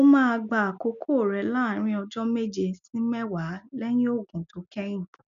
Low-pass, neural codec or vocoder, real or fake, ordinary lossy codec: 7.2 kHz; none; real; none